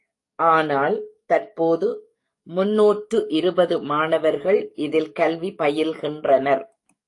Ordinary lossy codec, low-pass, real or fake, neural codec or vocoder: AAC, 48 kbps; 10.8 kHz; fake; codec, 44.1 kHz, 7.8 kbps, DAC